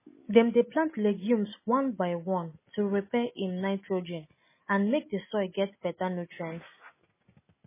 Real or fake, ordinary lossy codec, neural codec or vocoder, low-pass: real; MP3, 16 kbps; none; 3.6 kHz